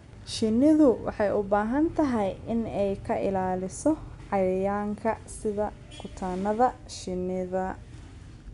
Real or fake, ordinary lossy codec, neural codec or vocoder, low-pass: real; none; none; 10.8 kHz